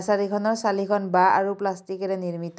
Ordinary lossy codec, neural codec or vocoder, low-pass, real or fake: none; none; none; real